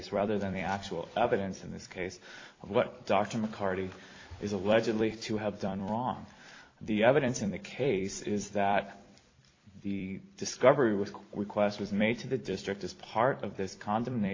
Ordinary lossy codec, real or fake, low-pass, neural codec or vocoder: AAC, 32 kbps; fake; 7.2 kHz; vocoder, 44.1 kHz, 128 mel bands every 256 samples, BigVGAN v2